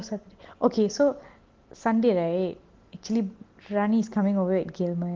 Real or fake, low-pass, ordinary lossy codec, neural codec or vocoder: real; 7.2 kHz; Opus, 16 kbps; none